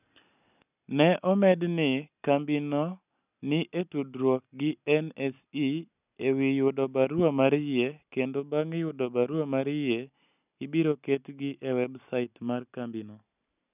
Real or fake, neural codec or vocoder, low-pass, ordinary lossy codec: real; none; 3.6 kHz; none